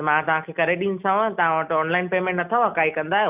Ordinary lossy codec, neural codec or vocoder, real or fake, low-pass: none; none; real; 3.6 kHz